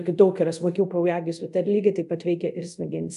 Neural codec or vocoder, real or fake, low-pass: codec, 24 kHz, 0.5 kbps, DualCodec; fake; 10.8 kHz